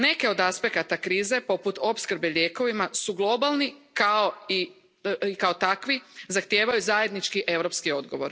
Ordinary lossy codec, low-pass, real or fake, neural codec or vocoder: none; none; real; none